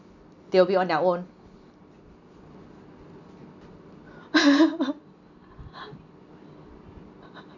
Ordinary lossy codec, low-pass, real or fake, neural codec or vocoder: none; 7.2 kHz; real; none